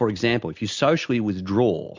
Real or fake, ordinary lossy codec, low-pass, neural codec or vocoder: real; MP3, 64 kbps; 7.2 kHz; none